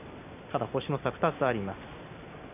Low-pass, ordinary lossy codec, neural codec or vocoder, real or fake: 3.6 kHz; none; none; real